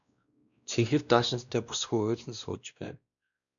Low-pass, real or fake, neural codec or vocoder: 7.2 kHz; fake; codec, 16 kHz, 1 kbps, X-Codec, WavLM features, trained on Multilingual LibriSpeech